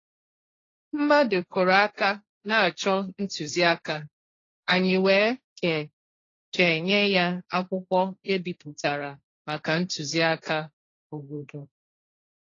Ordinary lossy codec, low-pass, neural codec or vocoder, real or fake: AAC, 32 kbps; 7.2 kHz; codec, 16 kHz, 1.1 kbps, Voila-Tokenizer; fake